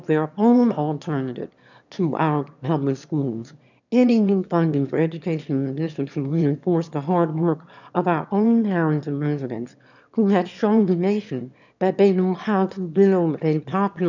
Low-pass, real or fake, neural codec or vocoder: 7.2 kHz; fake; autoencoder, 22.05 kHz, a latent of 192 numbers a frame, VITS, trained on one speaker